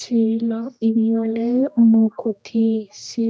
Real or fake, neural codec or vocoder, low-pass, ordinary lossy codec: fake; codec, 16 kHz, 1 kbps, X-Codec, HuBERT features, trained on general audio; none; none